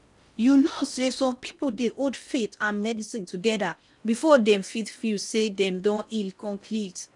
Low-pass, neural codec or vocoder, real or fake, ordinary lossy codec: 10.8 kHz; codec, 16 kHz in and 24 kHz out, 0.6 kbps, FocalCodec, streaming, 4096 codes; fake; none